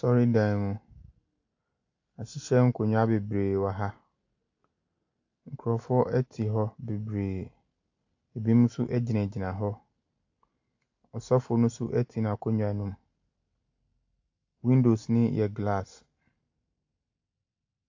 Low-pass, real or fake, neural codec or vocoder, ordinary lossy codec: 7.2 kHz; real; none; AAC, 48 kbps